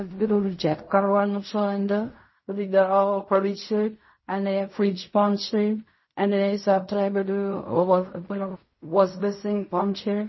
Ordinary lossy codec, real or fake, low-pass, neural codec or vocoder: MP3, 24 kbps; fake; 7.2 kHz; codec, 16 kHz in and 24 kHz out, 0.4 kbps, LongCat-Audio-Codec, fine tuned four codebook decoder